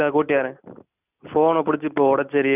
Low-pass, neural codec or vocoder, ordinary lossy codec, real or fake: 3.6 kHz; none; none; real